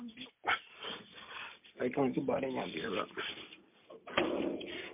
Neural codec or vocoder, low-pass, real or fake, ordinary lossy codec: none; 3.6 kHz; real; MP3, 32 kbps